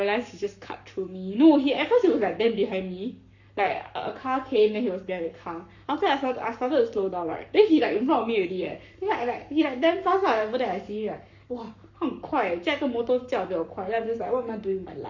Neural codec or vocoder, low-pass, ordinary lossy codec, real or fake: codec, 44.1 kHz, 7.8 kbps, Pupu-Codec; 7.2 kHz; none; fake